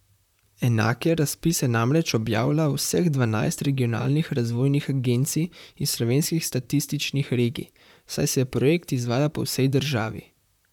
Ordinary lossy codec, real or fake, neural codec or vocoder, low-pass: none; fake; vocoder, 44.1 kHz, 128 mel bands, Pupu-Vocoder; 19.8 kHz